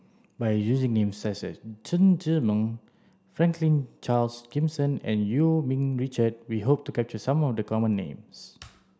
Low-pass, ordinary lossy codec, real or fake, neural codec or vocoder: none; none; real; none